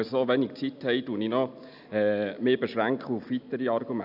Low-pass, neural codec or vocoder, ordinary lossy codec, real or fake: 5.4 kHz; none; none; real